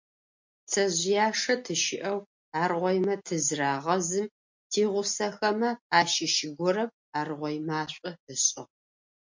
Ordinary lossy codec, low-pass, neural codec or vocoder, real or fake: MP3, 48 kbps; 7.2 kHz; none; real